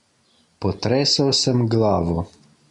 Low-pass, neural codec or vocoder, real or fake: 10.8 kHz; none; real